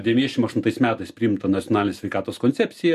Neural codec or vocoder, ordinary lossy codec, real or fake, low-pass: none; MP3, 64 kbps; real; 14.4 kHz